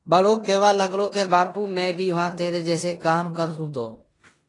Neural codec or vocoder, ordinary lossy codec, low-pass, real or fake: codec, 16 kHz in and 24 kHz out, 0.9 kbps, LongCat-Audio-Codec, four codebook decoder; AAC, 32 kbps; 10.8 kHz; fake